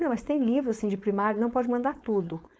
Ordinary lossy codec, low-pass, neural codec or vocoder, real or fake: none; none; codec, 16 kHz, 4.8 kbps, FACodec; fake